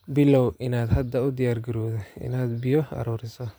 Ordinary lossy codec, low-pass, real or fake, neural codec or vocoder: none; none; fake; vocoder, 44.1 kHz, 128 mel bands every 512 samples, BigVGAN v2